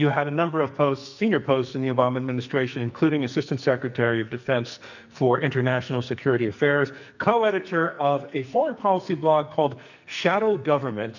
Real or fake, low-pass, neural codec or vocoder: fake; 7.2 kHz; codec, 44.1 kHz, 2.6 kbps, SNAC